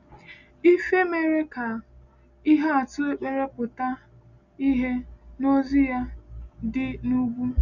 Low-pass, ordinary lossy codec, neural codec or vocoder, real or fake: 7.2 kHz; none; none; real